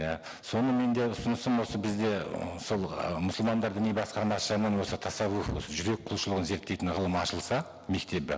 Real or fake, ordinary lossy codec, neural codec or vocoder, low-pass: real; none; none; none